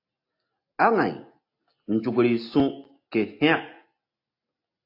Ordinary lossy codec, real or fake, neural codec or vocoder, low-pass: AAC, 32 kbps; real; none; 5.4 kHz